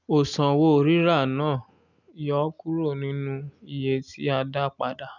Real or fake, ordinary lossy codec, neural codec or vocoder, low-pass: real; none; none; 7.2 kHz